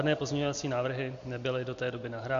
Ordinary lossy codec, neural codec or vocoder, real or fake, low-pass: MP3, 48 kbps; none; real; 7.2 kHz